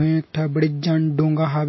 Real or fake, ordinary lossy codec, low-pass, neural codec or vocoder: real; MP3, 24 kbps; 7.2 kHz; none